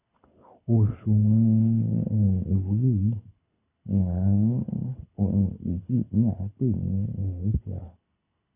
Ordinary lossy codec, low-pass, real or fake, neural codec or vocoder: none; 3.6 kHz; fake; codec, 24 kHz, 6 kbps, HILCodec